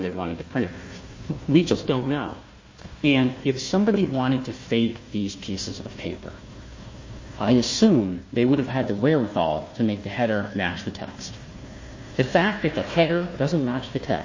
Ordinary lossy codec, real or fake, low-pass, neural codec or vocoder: MP3, 32 kbps; fake; 7.2 kHz; codec, 16 kHz, 1 kbps, FunCodec, trained on Chinese and English, 50 frames a second